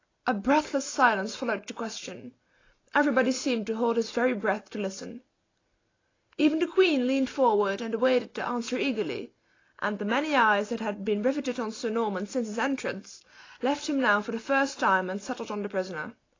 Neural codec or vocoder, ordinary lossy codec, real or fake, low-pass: none; AAC, 32 kbps; real; 7.2 kHz